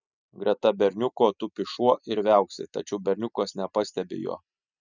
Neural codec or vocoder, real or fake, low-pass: none; real; 7.2 kHz